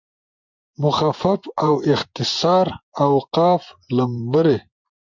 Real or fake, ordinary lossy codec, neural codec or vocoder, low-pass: fake; MP3, 64 kbps; vocoder, 24 kHz, 100 mel bands, Vocos; 7.2 kHz